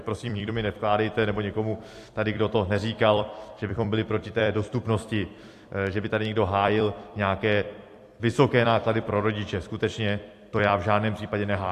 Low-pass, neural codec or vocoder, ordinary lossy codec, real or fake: 14.4 kHz; vocoder, 44.1 kHz, 128 mel bands every 256 samples, BigVGAN v2; AAC, 64 kbps; fake